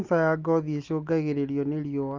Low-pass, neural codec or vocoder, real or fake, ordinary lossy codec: 7.2 kHz; none; real; Opus, 32 kbps